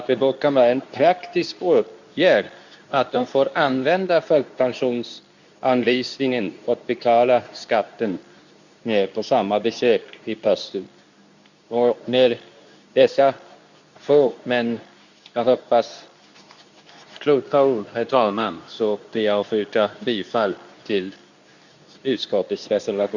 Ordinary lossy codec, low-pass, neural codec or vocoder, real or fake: none; 7.2 kHz; codec, 24 kHz, 0.9 kbps, WavTokenizer, medium speech release version 2; fake